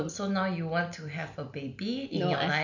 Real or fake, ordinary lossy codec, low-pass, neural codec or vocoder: real; none; 7.2 kHz; none